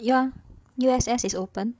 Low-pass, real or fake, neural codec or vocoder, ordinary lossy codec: none; fake; codec, 16 kHz, 16 kbps, FreqCodec, larger model; none